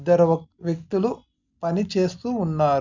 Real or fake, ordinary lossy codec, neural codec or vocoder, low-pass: real; none; none; 7.2 kHz